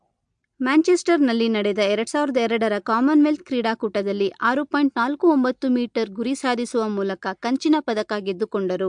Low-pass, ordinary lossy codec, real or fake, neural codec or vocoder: 9.9 kHz; MP3, 64 kbps; real; none